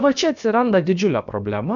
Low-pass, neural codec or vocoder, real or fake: 7.2 kHz; codec, 16 kHz, about 1 kbps, DyCAST, with the encoder's durations; fake